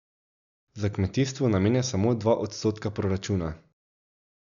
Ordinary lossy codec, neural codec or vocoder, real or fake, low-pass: none; none; real; 7.2 kHz